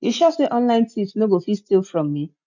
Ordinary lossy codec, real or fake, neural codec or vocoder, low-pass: none; real; none; 7.2 kHz